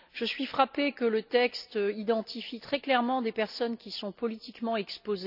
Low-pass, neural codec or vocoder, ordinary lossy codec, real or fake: 5.4 kHz; none; none; real